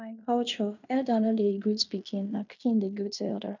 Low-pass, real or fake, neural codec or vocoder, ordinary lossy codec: 7.2 kHz; fake; codec, 16 kHz in and 24 kHz out, 0.9 kbps, LongCat-Audio-Codec, fine tuned four codebook decoder; none